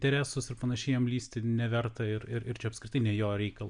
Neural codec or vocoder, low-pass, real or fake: none; 9.9 kHz; real